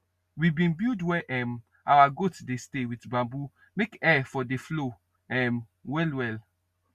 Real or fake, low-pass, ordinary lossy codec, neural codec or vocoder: real; 14.4 kHz; Opus, 64 kbps; none